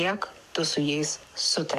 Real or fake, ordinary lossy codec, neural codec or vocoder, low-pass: fake; MP3, 96 kbps; codec, 44.1 kHz, 7.8 kbps, DAC; 14.4 kHz